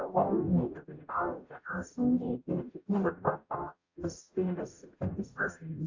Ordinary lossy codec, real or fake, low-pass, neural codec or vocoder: AAC, 48 kbps; fake; 7.2 kHz; codec, 44.1 kHz, 0.9 kbps, DAC